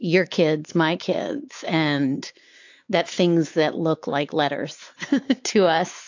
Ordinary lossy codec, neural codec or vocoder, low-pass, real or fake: AAC, 48 kbps; codec, 16 kHz, 4 kbps, X-Codec, WavLM features, trained on Multilingual LibriSpeech; 7.2 kHz; fake